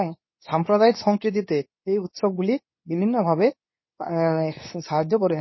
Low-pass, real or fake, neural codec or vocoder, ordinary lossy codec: 7.2 kHz; fake; codec, 24 kHz, 0.9 kbps, WavTokenizer, medium speech release version 2; MP3, 24 kbps